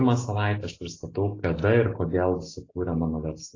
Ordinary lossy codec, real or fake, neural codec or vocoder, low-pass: AAC, 32 kbps; real; none; 7.2 kHz